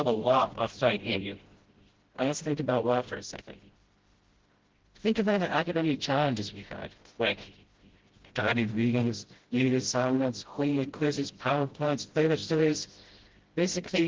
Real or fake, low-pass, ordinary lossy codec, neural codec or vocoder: fake; 7.2 kHz; Opus, 16 kbps; codec, 16 kHz, 0.5 kbps, FreqCodec, smaller model